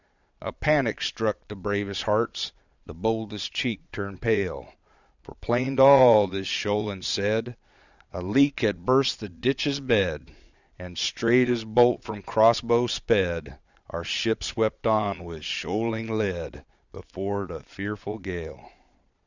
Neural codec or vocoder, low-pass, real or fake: vocoder, 22.05 kHz, 80 mel bands, Vocos; 7.2 kHz; fake